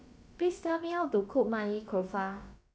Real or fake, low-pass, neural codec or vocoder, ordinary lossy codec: fake; none; codec, 16 kHz, about 1 kbps, DyCAST, with the encoder's durations; none